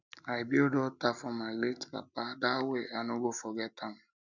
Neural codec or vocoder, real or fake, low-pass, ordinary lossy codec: none; real; 7.2 kHz; none